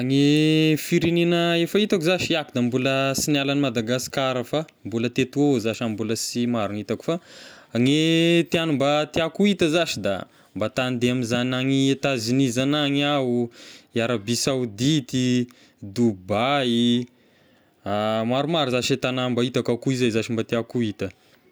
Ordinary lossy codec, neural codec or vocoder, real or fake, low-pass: none; none; real; none